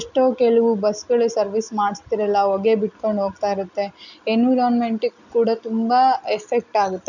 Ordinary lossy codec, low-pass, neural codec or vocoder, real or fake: none; 7.2 kHz; none; real